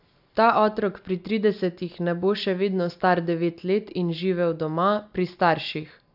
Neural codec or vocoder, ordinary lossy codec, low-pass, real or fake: none; AAC, 48 kbps; 5.4 kHz; real